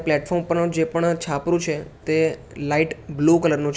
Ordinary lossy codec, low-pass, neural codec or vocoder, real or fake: none; none; none; real